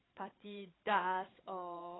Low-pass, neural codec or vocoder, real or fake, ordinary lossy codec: 7.2 kHz; codec, 16 kHz, 8 kbps, FreqCodec, larger model; fake; AAC, 16 kbps